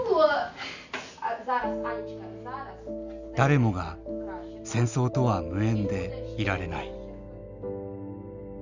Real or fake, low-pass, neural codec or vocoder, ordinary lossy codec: real; 7.2 kHz; none; none